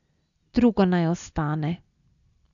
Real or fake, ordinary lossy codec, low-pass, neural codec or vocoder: real; none; 7.2 kHz; none